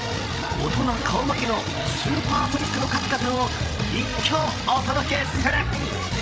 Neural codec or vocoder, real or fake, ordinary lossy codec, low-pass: codec, 16 kHz, 16 kbps, FreqCodec, larger model; fake; none; none